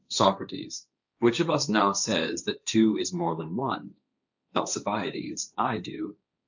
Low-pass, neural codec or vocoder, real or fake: 7.2 kHz; codec, 16 kHz, 1.1 kbps, Voila-Tokenizer; fake